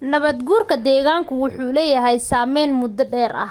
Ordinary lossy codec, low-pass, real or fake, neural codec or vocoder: Opus, 32 kbps; 19.8 kHz; fake; codec, 44.1 kHz, 7.8 kbps, DAC